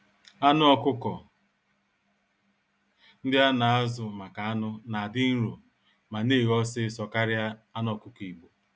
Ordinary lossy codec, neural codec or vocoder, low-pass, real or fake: none; none; none; real